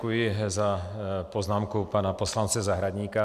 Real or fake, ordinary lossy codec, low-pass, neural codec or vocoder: real; MP3, 96 kbps; 14.4 kHz; none